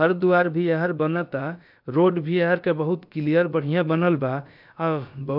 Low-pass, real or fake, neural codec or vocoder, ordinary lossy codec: 5.4 kHz; fake; codec, 16 kHz, about 1 kbps, DyCAST, with the encoder's durations; MP3, 48 kbps